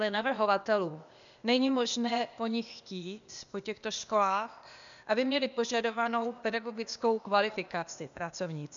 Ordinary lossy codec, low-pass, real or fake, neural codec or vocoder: MP3, 96 kbps; 7.2 kHz; fake; codec, 16 kHz, 0.8 kbps, ZipCodec